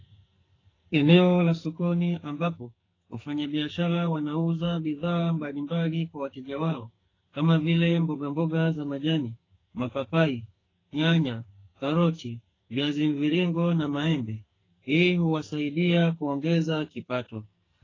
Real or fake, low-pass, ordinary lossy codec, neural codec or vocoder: fake; 7.2 kHz; AAC, 32 kbps; codec, 44.1 kHz, 2.6 kbps, SNAC